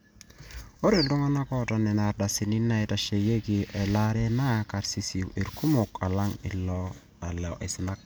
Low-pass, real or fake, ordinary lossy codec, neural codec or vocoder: none; real; none; none